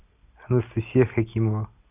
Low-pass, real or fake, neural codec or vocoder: 3.6 kHz; real; none